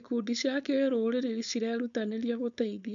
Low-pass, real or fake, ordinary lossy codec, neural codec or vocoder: 7.2 kHz; fake; none; codec, 16 kHz, 4.8 kbps, FACodec